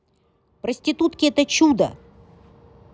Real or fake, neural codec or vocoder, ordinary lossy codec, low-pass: real; none; none; none